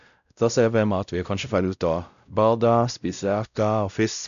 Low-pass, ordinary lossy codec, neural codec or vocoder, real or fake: 7.2 kHz; none; codec, 16 kHz, 0.5 kbps, X-Codec, WavLM features, trained on Multilingual LibriSpeech; fake